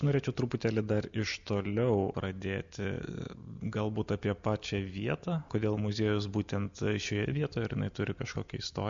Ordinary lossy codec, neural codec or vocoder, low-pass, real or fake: MP3, 64 kbps; none; 7.2 kHz; real